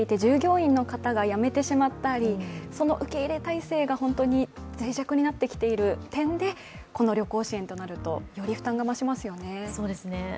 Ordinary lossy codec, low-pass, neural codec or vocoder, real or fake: none; none; none; real